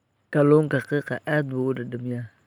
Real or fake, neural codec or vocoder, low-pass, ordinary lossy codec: real; none; 19.8 kHz; none